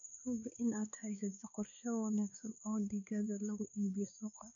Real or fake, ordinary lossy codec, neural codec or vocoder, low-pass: fake; none; codec, 16 kHz, 2 kbps, X-Codec, WavLM features, trained on Multilingual LibriSpeech; 7.2 kHz